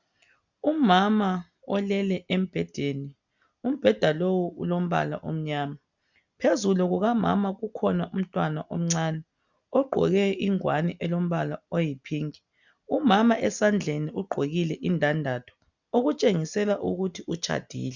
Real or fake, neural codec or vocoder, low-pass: real; none; 7.2 kHz